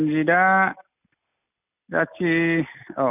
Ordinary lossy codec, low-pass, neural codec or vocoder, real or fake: none; 3.6 kHz; none; real